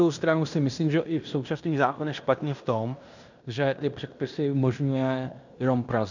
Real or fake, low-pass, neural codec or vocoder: fake; 7.2 kHz; codec, 16 kHz in and 24 kHz out, 0.9 kbps, LongCat-Audio-Codec, fine tuned four codebook decoder